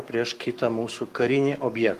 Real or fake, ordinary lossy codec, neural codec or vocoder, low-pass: fake; Opus, 24 kbps; codec, 44.1 kHz, 7.8 kbps, Pupu-Codec; 14.4 kHz